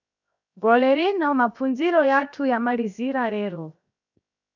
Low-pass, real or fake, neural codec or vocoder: 7.2 kHz; fake; codec, 16 kHz, 0.7 kbps, FocalCodec